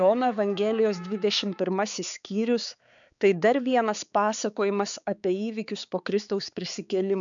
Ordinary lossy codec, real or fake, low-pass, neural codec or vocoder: MP3, 96 kbps; fake; 7.2 kHz; codec, 16 kHz, 4 kbps, X-Codec, HuBERT features, trained on balanced general audio